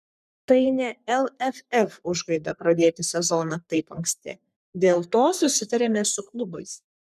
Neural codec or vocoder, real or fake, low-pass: codec, 44.1 kHz, 3.4 kbps, Pupu-Codec; fake; 14.4 kHz